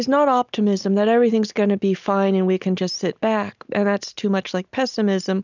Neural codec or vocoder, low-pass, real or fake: none; 7.2 kHz; real